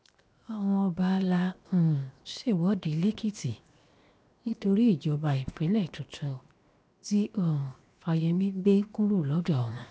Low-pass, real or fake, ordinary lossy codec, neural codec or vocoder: none; fake; none; codec, 16 kHz, 0.7 kbps, FocalCodec